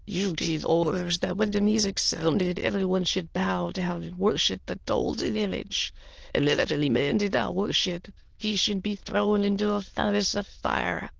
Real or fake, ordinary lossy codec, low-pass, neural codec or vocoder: fake; Opus, 16 kbps; 7.2 kHz; autoencoder, 22.05 kHz, a latent of 192 numbers a frame, VITS, trained on many speakers